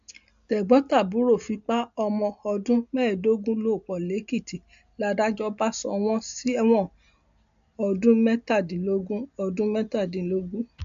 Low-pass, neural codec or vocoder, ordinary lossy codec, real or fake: 7.2 kHz; none; AAC, 96 kbps; real